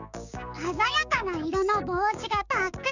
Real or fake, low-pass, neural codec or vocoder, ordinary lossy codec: fake; 7.2 kHz; codec, 16 kHz, 6 kbps, DAC; none